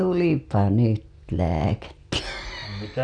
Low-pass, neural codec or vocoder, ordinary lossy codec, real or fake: 9.9 kHz; none; none; real